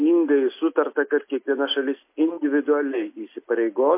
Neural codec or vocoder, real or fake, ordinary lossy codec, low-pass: none; real; MP3, 24 kbps; 3.6 kHz